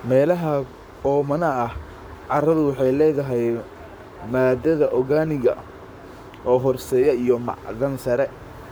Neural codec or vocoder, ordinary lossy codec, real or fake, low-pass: codec, 44.1 kHz, 7.8 kbps, Pupu-Codec; none; fake; none